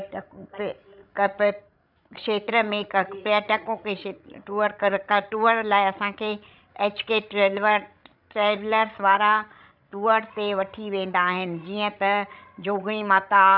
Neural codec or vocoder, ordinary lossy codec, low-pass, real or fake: codec, 16 kHz, 16 kbps, FreqCodec, larger model; none; 5.4 kHz; fake